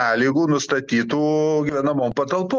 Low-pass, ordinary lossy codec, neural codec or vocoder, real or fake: 7.2 kHz; Opus, 24 kbps; none; real